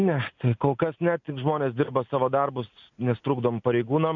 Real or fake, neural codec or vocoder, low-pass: real; none; 7.2 kHz